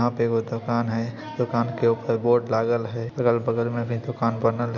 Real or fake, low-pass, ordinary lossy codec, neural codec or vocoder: real; 7.2 kHz; none; none